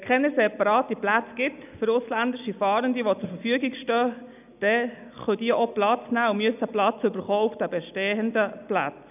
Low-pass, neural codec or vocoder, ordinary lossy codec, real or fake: 3.6 kHz; none; none; real